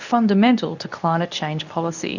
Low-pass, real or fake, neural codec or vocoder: 7.2 kHz; fake; codec, 24 kHz, 0.9 kbps, WavTokenizer, medium speech release version 2